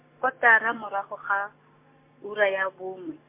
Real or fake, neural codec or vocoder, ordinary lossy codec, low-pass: real; none; MP3, 24 kbps; 3.6 kHz